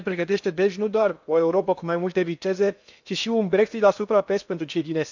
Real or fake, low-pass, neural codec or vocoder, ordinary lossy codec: fake; 7.2 kHz; codec, 16 kHz in and 24 kHz out, 0.8 kbps, FocalCodec, streaming, 65536 codes; none